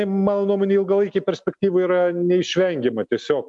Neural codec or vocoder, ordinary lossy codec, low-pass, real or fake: none; MP3, 64 kbps; 10.8 kHz; real